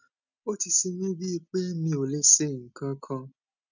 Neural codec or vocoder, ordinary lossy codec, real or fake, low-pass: none; none; real; 7.2 kHz